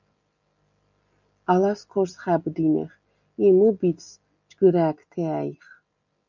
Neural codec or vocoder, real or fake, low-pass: none; real; 7.2 kHz